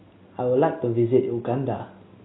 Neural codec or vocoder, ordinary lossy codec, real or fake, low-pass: none; AAC, 16 kbps; real; 7.2 kHz